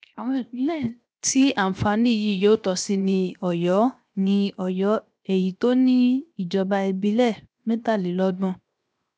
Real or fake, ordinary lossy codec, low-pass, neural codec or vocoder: fake; none; none; codec, 16 kHz, 0.7 kbps, FocalCodec